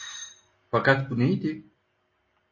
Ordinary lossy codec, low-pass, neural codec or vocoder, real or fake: MP3, 32 kbps; 7.2 kHz; none; real